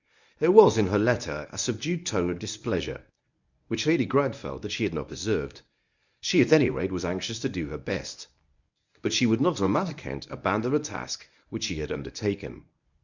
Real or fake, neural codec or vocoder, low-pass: fake; codec, 24 kHz, 0.9 kbps, WavTokenizer, medium speech release version 1; 7.2 kHz